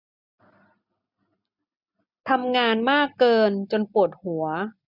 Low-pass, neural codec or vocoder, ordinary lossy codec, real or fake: 5.4 kHz; none; none; real